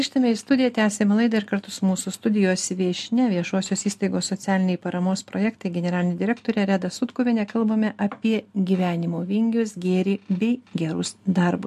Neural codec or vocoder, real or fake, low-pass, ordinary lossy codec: none; real; 14.4 kHz; MP3, 64 kbps